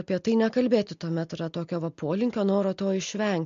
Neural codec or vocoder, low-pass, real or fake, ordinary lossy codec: none; 7.2 kHz; real; MP3, 48 kbps